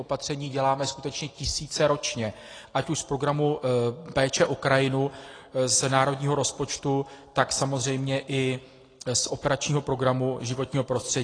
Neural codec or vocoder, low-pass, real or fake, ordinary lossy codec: none; 9.9 kHz; real; AAC, 32 kbps